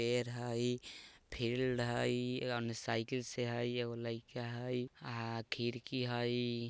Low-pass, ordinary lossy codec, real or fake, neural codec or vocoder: none; none; real; none